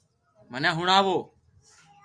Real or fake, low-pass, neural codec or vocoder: real; 9.9 kHz; none